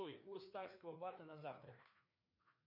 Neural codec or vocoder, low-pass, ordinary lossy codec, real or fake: codec, 16 kHz, 2 kbps, FreqCodec, larger model; 5.4 kHz; MP3, 32 kbps; fake